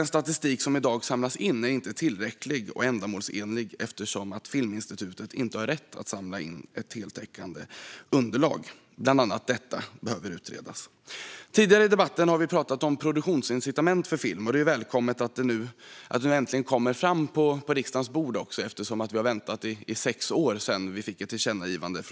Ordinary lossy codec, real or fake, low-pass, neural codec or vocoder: none; real; none; none